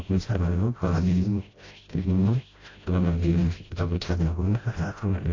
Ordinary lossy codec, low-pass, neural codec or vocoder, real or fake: AAC, 32 kbps; 7.2 kHz; codec, 16 kHz, 0.5 kbps, FreqCodec, smaller model; fake